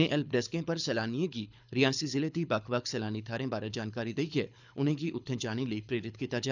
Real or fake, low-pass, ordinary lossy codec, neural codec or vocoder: fake; 7.2 kHz; none; codec, 24 kHz, 6 kbps, HILCodec